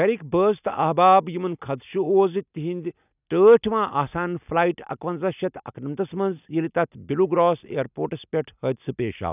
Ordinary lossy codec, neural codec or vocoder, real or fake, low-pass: none; none; real; 3.6 kHz